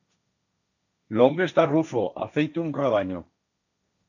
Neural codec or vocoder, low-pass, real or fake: codec, 16 kHz, 1.1 kbps, Voila-Tokenizer; 7.2 kHz; fake